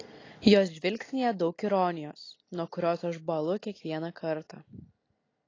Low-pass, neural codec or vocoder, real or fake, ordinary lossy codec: 7.2 kHz; none; real; AAC, 32 kbps